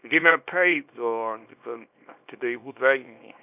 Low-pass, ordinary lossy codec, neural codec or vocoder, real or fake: 3.6 kHz; none; codec, 24 kHz, 0.9 kbps, WavTokenizer, small release; fake